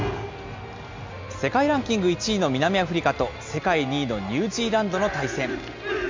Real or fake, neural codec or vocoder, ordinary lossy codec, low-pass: real; none; MP3, 64 kbps; 7.2 kHz